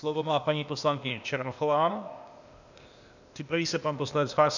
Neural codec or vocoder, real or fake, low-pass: codec, 16 kHz, 0.8 kbps, ZipCodec; fake; 7.2 kHz